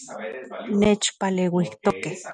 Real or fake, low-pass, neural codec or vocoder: real; 10.8 kHz; none